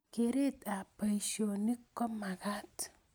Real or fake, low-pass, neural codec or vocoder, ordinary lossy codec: real; none; none; none